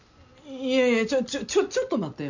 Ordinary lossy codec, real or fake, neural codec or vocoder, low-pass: none; real; none; 7.2 kHz